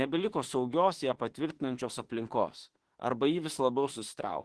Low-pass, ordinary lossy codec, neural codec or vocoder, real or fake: 10.8 kHz; Opus, 16 kbps; autoencoder, 48 kHz, 32 numbers a frame, DAC-VAE, trained on Japanese speech; fake